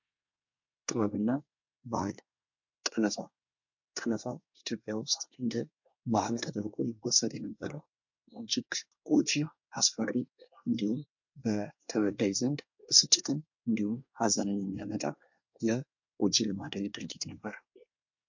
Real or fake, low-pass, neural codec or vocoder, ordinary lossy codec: fake; 7.2 kHz; codec, 24 kHz, 1 kbps, SNAC; MP3, 48 kbps